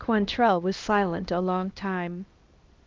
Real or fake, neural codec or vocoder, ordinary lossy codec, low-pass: fake; codec, 16 kHz, 1 kbps, X-Codec, HuBERT features, trained on LibriSpeech; Opus, 32 kbps; 7.2 kHz